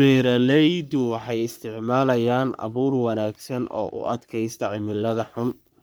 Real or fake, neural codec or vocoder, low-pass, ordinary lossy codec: fake; codec, 44.1 kHz, 3.4 kbps, Pupu-Codec; none; none